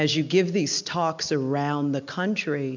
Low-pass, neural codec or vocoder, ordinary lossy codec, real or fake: 7.2 kHz; none; MP3, 64 kbps; real